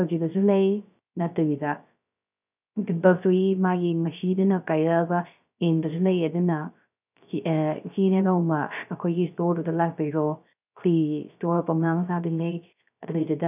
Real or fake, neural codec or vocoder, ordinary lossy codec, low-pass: fake; codec, 16 kHz, 0.3 kbps, FocalCodec; none; 3.6 kHz